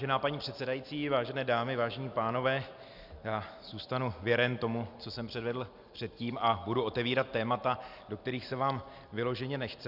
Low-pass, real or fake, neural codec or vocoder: 5.4 kHz; real; none